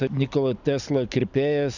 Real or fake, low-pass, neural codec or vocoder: fake; 7.2 kHz; vocoder, 44.1 kHz, 128 mel bands every 256 samples, BigVGAN v2